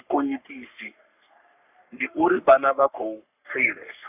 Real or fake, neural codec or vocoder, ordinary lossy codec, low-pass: fake; codec, 44.1 kHz, 3.4 kbps, Pupu-Codec; AAC, 32 kbps; 3.6 kHz